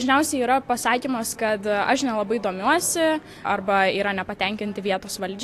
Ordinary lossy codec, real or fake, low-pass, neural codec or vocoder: AAC, 64 kbps; real; 14.4 kHz; none